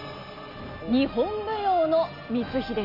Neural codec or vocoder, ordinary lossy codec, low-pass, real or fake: none; none; 5.4 kHz; real